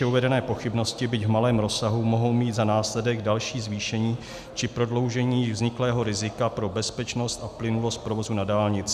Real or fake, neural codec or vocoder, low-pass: real; none; 14.4 kHz